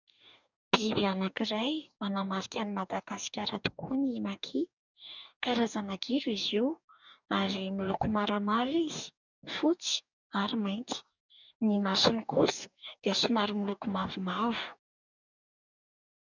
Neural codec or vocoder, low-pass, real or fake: codec, 44.1 kHz, 2.6 kbps, DAC; 7.2 kHz; fake